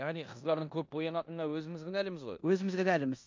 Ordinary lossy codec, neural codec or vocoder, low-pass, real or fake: MP3, 48 kbps; codec, 16 kHz in and 24 kHz out, 0.9 kbps, LongCat-Audio-Codec, four codebook decoder; 7.2 kHz; fake